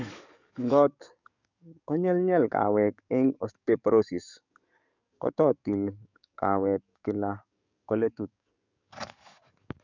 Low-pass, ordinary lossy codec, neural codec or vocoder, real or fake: 7.2 kHz; none; codec, 44.1 kHz, 7.8 kbps, DAC; fake